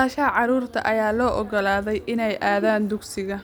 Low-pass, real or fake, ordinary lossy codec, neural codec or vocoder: none; real; none; none